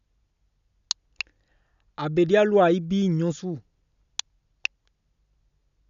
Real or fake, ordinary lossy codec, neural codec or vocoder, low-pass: real; none; none; 7.2 kHz